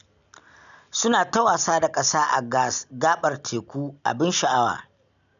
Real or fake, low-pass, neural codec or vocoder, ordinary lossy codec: real; 7.2 kHz; none; none